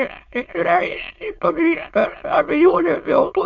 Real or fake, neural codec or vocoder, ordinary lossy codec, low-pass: fake; autoencoder, 22.05 kHz, a latent of 192 numbers a frame, VITS, trained on many speakers; MP3, 48 kbps; 7.2 kHz